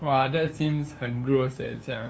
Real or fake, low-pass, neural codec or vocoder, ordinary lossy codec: fake; none; codec, 16 kHz, 2 kbps, FunCodec, trained on LibriTTS, 25 frames a second; none